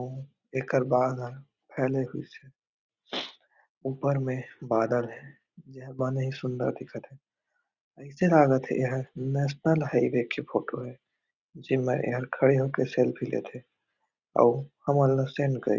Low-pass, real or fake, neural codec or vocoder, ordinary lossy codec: 7.2 kHz; real; none; Opus, 64 kbps